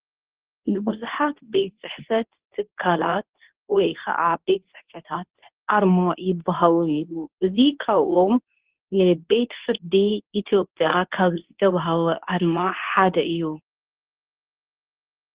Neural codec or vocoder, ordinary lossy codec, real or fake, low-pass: codec, 24 kHz, 0.9 kbps, WavTokenizer, medium speech release version 2; Opus, 16 kbps; fake; 3.6 kHz